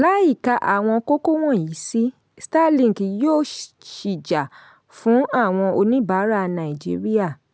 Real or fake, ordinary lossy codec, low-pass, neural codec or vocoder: real; none; none; none